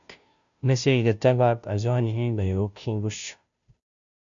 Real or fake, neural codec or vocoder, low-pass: fake; codec, 16 kHz, 0.5 kbps, FunCodec, trained on Chinese and English, 25 frames a second; 7.2 kHz